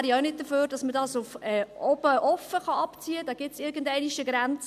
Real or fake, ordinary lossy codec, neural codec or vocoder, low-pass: real; MP3, 96 kbps; none; 14.4 kHz